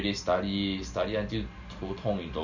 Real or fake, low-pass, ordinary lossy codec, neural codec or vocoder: real; 7.2 kHz; none; none